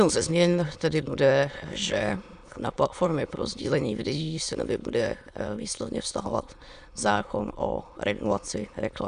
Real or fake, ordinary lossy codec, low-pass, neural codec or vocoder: fake; Opus, 64 kbps; 9.9 kHz; autoencoder, 22.05 kHz, a latent of 192 numbers a frame, VITS, trained on many speakers